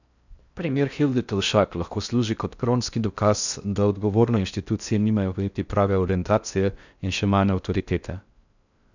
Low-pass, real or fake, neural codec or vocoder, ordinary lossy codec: 7.2 kHz; fake; codec, 16 kHz in and 24 kHz out, 0.6 kbps, FocalCodec, streaming, 4096 codes; none